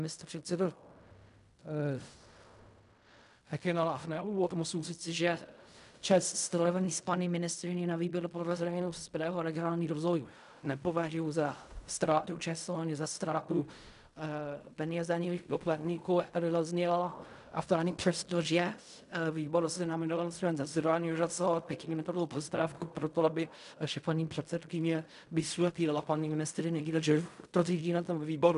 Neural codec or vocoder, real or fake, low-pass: codec, 16 kHz in and 24 kHz out, 0.4 kbps, LongCat-Audio-Codec, fine tuned four codebook decoder; fake; 10.8 kHz